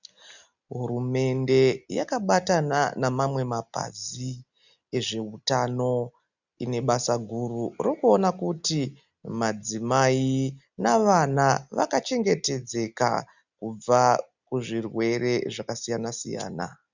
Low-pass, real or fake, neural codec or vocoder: 7.2 kHz; real; none